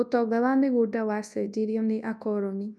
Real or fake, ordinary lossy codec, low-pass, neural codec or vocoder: fake; none; none; codec, 24 kHz, 0.9 kbps, WavTokenizer, large speech release